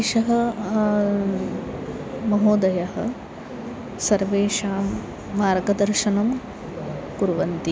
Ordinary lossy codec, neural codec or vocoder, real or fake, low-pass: none; none; real; none